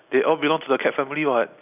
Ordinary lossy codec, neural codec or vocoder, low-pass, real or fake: none; none; 3.6 kHz; real